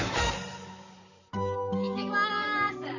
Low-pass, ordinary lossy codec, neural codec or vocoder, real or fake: 7.2 kHz; none; vocoder, 44.1 kHz, 128 mel bands, Pupu-Vocoder; fake